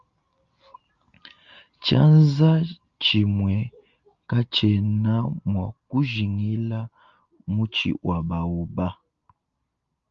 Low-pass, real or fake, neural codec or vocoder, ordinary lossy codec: 7.2 kHz; real; none; Opus, 32 kbps